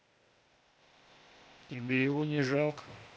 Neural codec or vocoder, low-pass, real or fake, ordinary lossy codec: codec, 16 kHz, 0.8 kbps, ZipCodec; none; fake; none